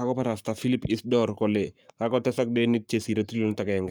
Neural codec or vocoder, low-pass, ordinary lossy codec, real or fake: codec, 44.1 kHz, 7.8 kbps, Pupu-Codec; none; none; fake